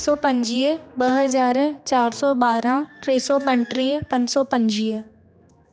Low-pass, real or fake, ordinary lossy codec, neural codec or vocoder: none; fake; none; codec, 16 kHz, 2 kbps, X-Codec, HuBERT features, trained on general audio